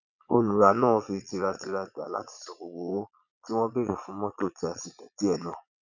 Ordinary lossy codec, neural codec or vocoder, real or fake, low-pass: none; vocoder, 22.05 kHz, 80 mel bands, Vocos; fake; 7.2 kHz